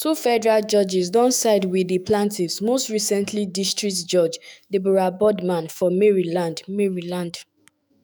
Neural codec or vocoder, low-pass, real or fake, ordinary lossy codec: autoencoder, 48 kHz, 128 numbers a frame, DAC-VAE, trained on Japanese speech; none; fake; none